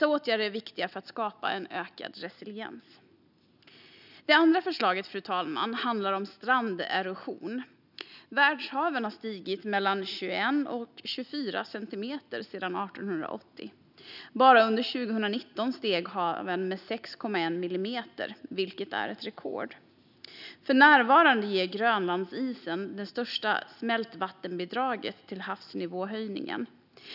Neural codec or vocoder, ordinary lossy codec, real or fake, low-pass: none; none; real; 5.4 kHz